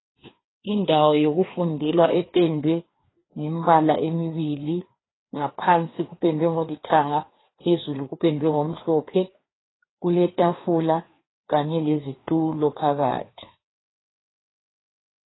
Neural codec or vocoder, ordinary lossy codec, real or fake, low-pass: codec, 16 kHz, 2 kbps, FreqCodec, larger model; AAC, 16 kbps; fake; 7.2 kHz